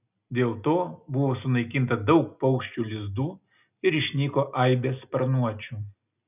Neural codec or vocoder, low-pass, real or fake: none; 3.6 kHz; real